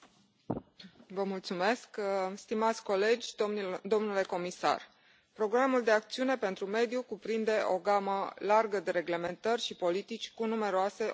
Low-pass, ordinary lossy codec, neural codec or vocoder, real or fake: none; none; none; real